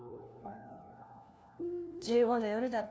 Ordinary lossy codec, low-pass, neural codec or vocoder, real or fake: none; none; codec, 16 kHz, 1 kbps, FunCodec, trained on LibriTTS, 50 frames a second; fake